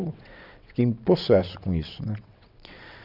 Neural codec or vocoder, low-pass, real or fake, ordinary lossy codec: vocoder, 22.05 kHz, 80 mel bands, WaveNeXt; 5.4 kHz; fake; none